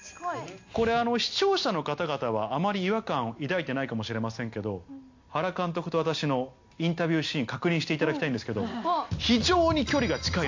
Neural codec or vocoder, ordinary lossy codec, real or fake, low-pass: none; MP3, 48 kbps; real; 7.2 kHz